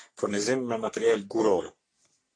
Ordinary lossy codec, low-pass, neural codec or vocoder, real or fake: AAC, 48 kbps; 9.9 kHz; codec, 44.1 kHz, 3.4 kbps, Pupu-Codec; fake